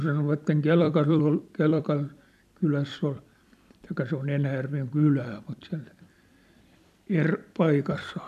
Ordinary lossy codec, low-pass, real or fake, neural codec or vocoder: none; 14.4 kHz; fake; vocoder, 44.1 kHz, 128 mel bands every 256 samples, BigVGAN v2